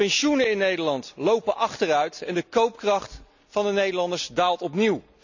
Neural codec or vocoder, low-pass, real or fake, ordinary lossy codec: none; 7.2 kHz; real; none